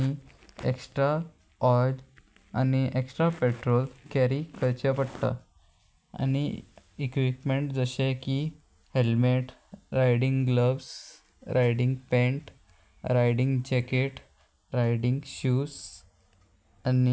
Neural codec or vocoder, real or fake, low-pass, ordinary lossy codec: none; real; none; none